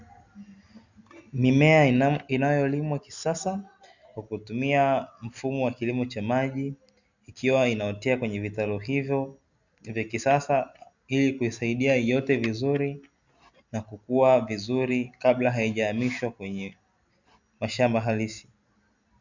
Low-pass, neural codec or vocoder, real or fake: 7.2 kHz; none; real